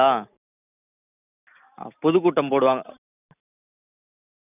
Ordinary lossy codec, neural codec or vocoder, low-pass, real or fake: none; none; 3.6 kHz; real